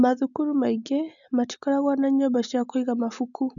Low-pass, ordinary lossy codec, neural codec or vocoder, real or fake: 7.2 kHz; none; none; real